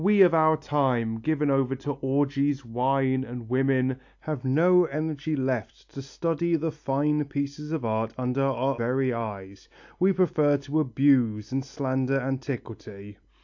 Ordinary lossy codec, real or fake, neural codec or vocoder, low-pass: AAC, 48 kbps; real; none; 7.2 kHz